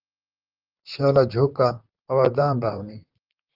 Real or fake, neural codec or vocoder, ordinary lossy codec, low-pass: fake; vocoder, 44.1 kHz, 128 mel bands, Pupu-Vocoder; Opus, 24 kbps; 5.4 kHz